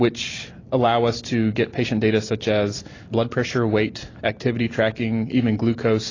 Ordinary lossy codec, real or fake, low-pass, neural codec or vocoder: AAC, 32 kbps; real; 7.2 kHz; none